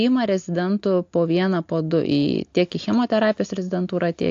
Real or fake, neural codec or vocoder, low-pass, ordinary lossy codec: real; none; 7.2 kHz; AAC, 48 kbps